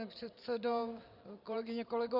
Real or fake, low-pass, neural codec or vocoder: fake; 5.4 kHz; vocoder, 44.1 kHz, 128 mel bands every 512 samples, BigVGAN v2